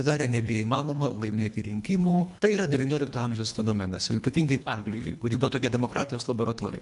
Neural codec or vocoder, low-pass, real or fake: codec, 24 kHz, 1.5 kbps, HILCodec; 10.8 kHz; fake